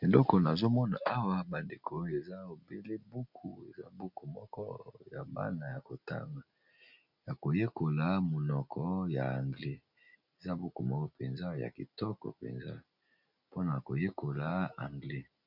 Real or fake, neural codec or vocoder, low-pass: real; none; 5.4 kHz